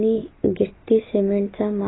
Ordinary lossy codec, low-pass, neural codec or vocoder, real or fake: AAC, 16 kbps; 7.2 kHz; none; real